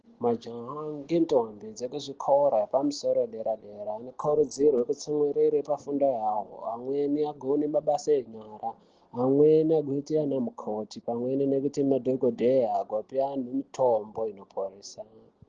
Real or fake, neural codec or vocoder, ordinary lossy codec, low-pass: real; none; Opus, 16 kbps; 7.2 kHz